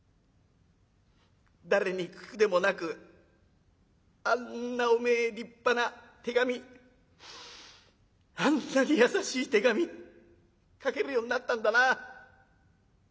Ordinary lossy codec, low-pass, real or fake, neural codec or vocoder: none; none; real; none